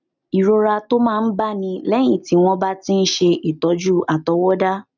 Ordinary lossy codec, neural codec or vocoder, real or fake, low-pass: none; none; real; 7.2 kHz